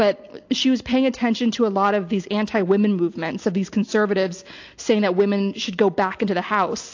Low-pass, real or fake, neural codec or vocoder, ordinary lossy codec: 7.2 kHz; real; none; AAC, 48 kbps